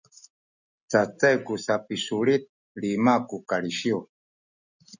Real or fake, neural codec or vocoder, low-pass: real; none; 7.2 kHz